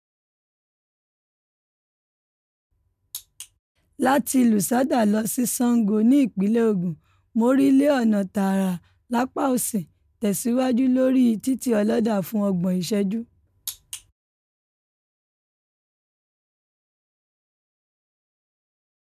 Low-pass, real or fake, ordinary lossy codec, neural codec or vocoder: 14.4 kHz; real; none; none